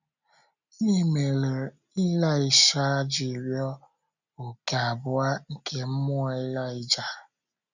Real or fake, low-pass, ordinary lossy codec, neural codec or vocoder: real; 7.2 kHz; none; none